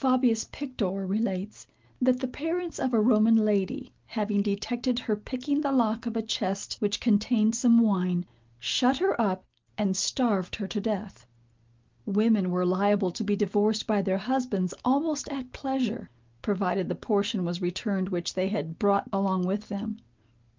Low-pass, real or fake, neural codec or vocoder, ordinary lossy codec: 7.2 kHz; real; none; Opus, 32 kbps